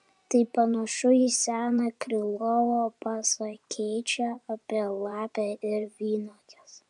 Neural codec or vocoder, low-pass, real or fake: vocoder, 24 kHz, 100 mel bands, Vocos; 10.8 kHz; fake